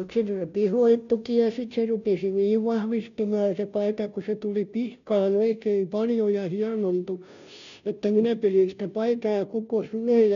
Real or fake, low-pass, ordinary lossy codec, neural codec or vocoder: fake; 7.2 kHz; none; codec, 16 kHz, 0.5 kbps, FunCodec, trained on Chinese and English, 25 frames a second